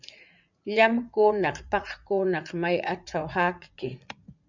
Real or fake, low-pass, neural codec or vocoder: fake; 7.2 kHz; vocoder, 44.1 kHz, 80 mel bands, Vocos